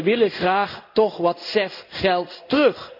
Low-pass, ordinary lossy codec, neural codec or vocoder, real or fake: 5.4 kHz; none; codec, 16 kHz in and 24 kHz out, 1 kbps, XY-Tokenizer; fake